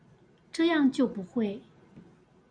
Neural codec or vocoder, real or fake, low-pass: none; real; 9.9 kHz